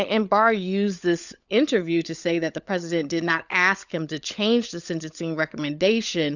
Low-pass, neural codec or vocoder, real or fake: 7.2 kHz; codec, 16 kHz, 16 kbps, FunCodec, trained on LibriTTS, 50 frames a second; fake